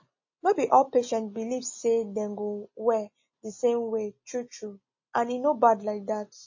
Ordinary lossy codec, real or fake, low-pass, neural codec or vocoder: MP3, 32 kbps; real; 7.2 kHz; none